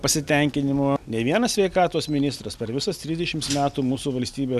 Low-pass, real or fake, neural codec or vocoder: 14.4 kHz; real; none